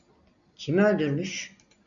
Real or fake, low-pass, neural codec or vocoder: real; 7.2 kHz; none